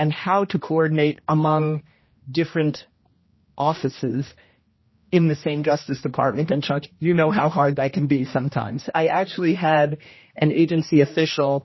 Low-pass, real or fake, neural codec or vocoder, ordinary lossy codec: 7.2 kHz; fake; codec, 16 kHz, 1 kbps, X-Codec, HuBERT features, trained on general audio; MP3, 24 kbps